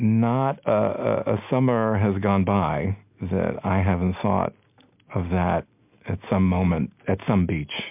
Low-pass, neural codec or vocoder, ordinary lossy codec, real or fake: 3.6 kHz; none; MP3, 32 kbps; real